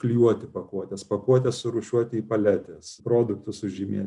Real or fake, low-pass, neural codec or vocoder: real; 10.8 kHz; none